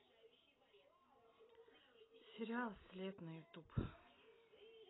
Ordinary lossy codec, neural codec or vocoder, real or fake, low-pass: AAC, 16 kbps; none; real; 7.2 kHz